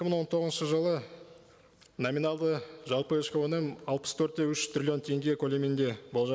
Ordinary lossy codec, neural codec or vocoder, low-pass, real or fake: none; none; none; real